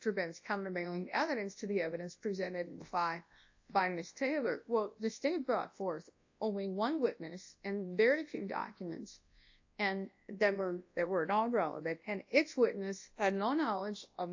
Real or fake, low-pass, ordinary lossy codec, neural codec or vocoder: fake; 7.2 kHz; AAC, 48 kbps; codec, 24 kHz, 0.9 kbps, WavTokenizer, large speech release